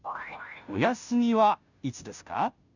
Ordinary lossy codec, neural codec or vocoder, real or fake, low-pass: none; codec, 16 kHz, 0.5 kbps, FunCodec, trained on Chinese and English, 25 frames a second; fake; 7.2 kHz